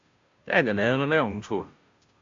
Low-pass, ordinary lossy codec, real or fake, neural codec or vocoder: 7.2 kHz; AAC, 48 kbps; fake; codec, 16 kHz, 0.5 kbps, FunCodec, trained on Chinese and English, 25 frames a second